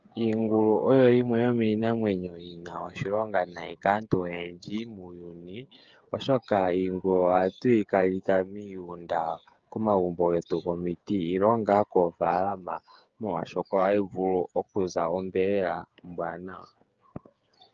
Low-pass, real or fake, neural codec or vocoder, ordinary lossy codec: 7.2 kHz; fake; codec, 16 kHz, 8 kbps, FreqCodec, smaller model; Opus, 24 kbps